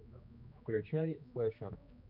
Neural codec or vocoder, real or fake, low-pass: codec, 16 kHz, 2 kbps, X-Codec, HuBERT features, trained on general audio; fake; 5.4 kHz